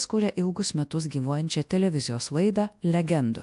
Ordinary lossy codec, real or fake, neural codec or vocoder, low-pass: AAC, 64 kbps; fake; codec, 24 kHz, 0.9 kbps, WavTokenizer, large speech release; 10.8 kHz